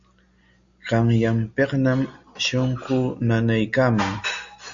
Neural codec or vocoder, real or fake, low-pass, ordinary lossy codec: none; real; 7.2 kHz; AAC, 64 kbps